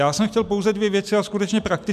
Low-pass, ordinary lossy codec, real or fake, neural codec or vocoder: 14.4 kHz; MP3, 96 kbps; real; none